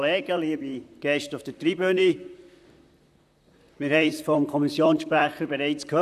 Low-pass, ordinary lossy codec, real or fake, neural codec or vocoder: 14.4 kHz; none; fake; vocoder, 44.1 kHz, 128 mel bands, Pupu-Vocoder